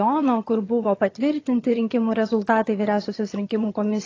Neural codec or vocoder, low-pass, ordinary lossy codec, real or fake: vocoder, 22.05 kHz, 80 mel bands, HiFi-GAN; 7.2 kHz; AAC, 32 kbps; fake